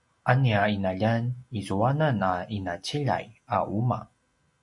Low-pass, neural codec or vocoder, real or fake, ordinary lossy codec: 10.8 kHz; none; real; MP3, 48 kbps